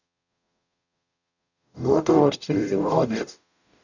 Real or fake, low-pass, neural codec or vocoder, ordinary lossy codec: fake; 7.2 kHz; codec, 44.1 kHz, 0.9 kbps, DAC; none